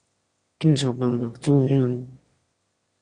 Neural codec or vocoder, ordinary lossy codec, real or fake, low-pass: autoencoder, 22.05 kHz, a latent of 192 numbers a frame, VITS, trained on one speaker; Opus, 64 kbps; fake; 9.9 kHz